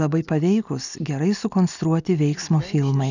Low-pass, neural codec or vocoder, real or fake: 7.2 kHz; none; real